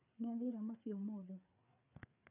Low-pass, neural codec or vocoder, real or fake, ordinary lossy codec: 3.6 kHz; codec, 16 kHz, 4 kbps, FreqCodec, larger model; fake; none